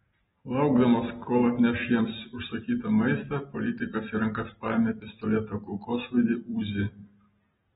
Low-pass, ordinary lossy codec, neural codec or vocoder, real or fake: 19.8 kHz; AAC, 16 kbps; none; real